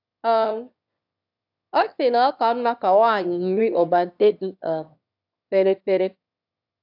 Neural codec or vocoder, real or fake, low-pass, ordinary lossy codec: autoencoder, 22.05 kHz, a latent of 192 numbers a frame, VITS, trained on one speaker; fake; 5.4 kHz; AAC, 48 kbps